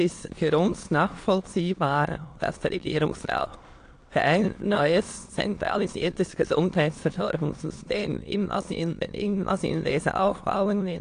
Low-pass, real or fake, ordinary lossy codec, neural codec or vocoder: 9.9 kHz; fake; AAC, 48 kbps; autoencoder, 22.05 kHz, a latent of 192 numbers a frame, VITS, trained on many speakers